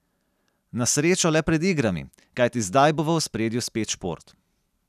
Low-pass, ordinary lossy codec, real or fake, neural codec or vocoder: 14.4 kHz; none; real; none